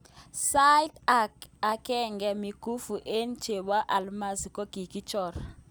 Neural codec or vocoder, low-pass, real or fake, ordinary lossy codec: none; none; real; none